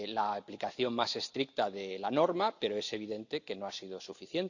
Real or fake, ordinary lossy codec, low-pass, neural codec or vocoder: real; none; 7.2 kHz; none